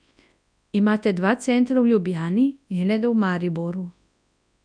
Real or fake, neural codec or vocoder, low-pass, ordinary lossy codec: fake; codec, 24 kHz, 0.9 kbps, WavTokenizer, large speech release; 9.9 kHz; none